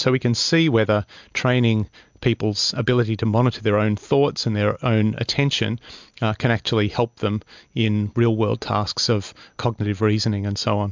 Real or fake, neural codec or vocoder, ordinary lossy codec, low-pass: real; none; MP3, 64 kbps; 7.2 kHz